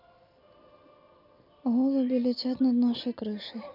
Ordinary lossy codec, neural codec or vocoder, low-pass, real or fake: none; none; 5.4 kHz; real